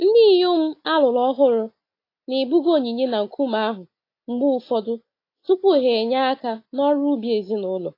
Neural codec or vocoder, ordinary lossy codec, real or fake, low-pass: none; AAC, 32 kbps; real; 5.4 kHz